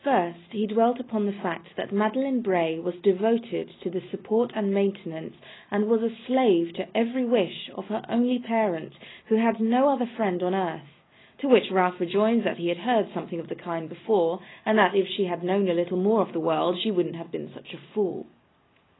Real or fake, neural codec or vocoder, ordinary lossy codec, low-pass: real; none; AAC, 16 kbps; 7.2 kHz